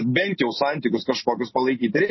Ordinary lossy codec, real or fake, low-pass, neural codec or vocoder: MP3, 24 kbps; real; 7.2 kHz; none